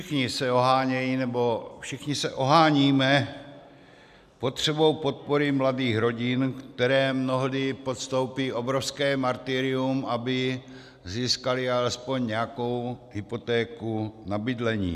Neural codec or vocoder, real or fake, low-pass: none; real; 14.4 kHz